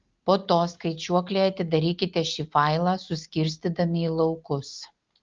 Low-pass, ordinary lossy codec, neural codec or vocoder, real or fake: 7.2 kHz; Opus, 32 kbps; none; real